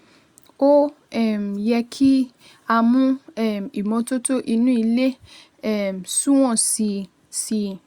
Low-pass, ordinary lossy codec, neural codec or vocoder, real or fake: 19.8 kHz; Opus, 64 kbps; none; real